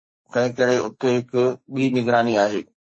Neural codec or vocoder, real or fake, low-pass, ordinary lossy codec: codec, 44.1 kHz, 2.6 kbps, SNAC; fake; 9.9 kHz; MP3, 32 kbps